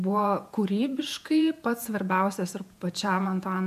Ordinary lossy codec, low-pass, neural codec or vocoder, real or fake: MP3, 96 kbps; 14.4 kHz; vocoder, 48 kHz, 128 mel bands, Vocos; fake